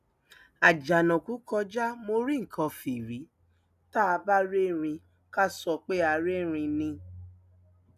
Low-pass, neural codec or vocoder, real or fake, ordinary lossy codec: 14.4 kHz; none; real; none